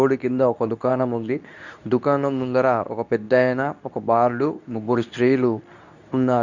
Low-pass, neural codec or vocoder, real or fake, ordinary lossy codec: 7.2 kHz; codec, 24 kHz, 0.9 kbps, WavTokenizer, medium speech release version 2; fake; none